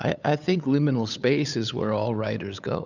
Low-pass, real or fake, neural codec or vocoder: 7.2 kHz; fake; codec, 16 kHz, 8 kbps, FunCodec, trained on LibriTTS, 25 frames a second